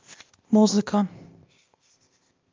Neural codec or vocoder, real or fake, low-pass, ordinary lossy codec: codec, 16 kHz, 0.8 kbps, ZipCodec; fake; 7.2 kHz; Opus, 24 kbps